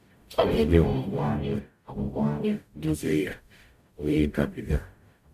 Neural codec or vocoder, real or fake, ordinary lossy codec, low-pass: codec, 44.1 kHz, 0.9 kbps, DAC; fake; none; 14.4 kHz